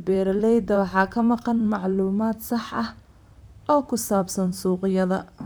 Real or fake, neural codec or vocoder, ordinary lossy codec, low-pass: fake; vocoder, 44.1 kHz, 128 mel bands, Pupu-Vocoder; none; none